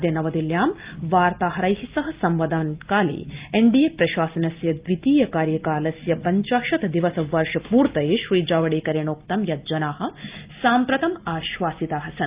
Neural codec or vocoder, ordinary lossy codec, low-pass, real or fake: none; Opus, 32 kbps; 3.6 kHz; real